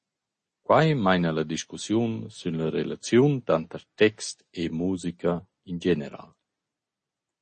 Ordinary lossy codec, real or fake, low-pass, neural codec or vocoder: MP3, 32 kbps; real; 10.8 kHz; none